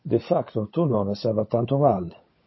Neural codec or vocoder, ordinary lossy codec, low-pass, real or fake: codec, 16 kHz, 16 kbps, FunCodec, trained on LibriTTS, 50 frames a second; MP3, 24 kbps; 7.2 kHz; fake